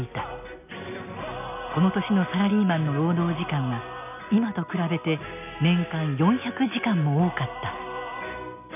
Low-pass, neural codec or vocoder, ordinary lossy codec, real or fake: 3.6 kHz; none; none; real